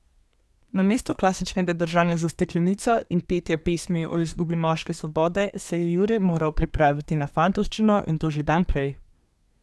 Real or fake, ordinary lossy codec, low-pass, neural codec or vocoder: fake; none; none; codec, 24 kHz, 1 kbps, SNAC